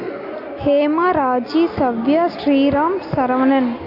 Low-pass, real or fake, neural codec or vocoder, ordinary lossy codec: 5.4 kHz; real; none; AAC, 32 kbps